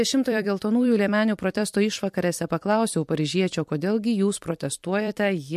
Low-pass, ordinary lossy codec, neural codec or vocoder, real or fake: 14.4 kHz; MP3, 64 kbps; vocoder, 44.1 kHz, 128 mel bands every 256 samples, BigVGAN v2; fake